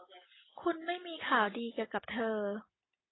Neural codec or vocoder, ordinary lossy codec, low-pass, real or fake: none; AAC, 16 kbps; 7.2 kHz; real